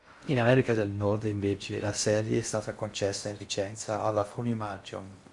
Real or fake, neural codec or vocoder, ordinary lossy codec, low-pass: fake; codec, 16 kHz in and 24 kHz out, 0.6 kbps, FocalCodec, streaming, 4096 codes; Opus, 64 kbps; 10.8 kHz